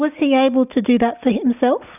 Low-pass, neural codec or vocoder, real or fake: 3.6 kHz; none; real